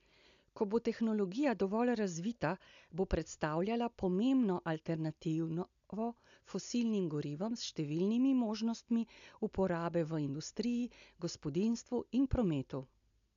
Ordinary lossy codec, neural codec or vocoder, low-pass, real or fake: AAC, 96 kbps; none; 7.2 kHz; real